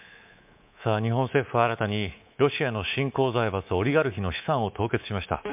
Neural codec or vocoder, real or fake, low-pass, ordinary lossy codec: codec, 24 kHz, 3.1 kbps, DualCodec; fake; 3.6 kHz; MP3, 32 kbps